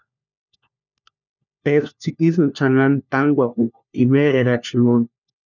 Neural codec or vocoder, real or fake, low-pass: codec, 16 kHz, 1 kbps, FunCodec, trained on LibriTTS, 50 frames a second; fake; 7.2 kHz